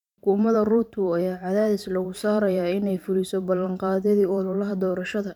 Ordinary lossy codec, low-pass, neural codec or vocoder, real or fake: none; 19.8 kHz; vocoder, 44.1 kHz, 128 mel bands every 512 samples, BigVGAN v2; fake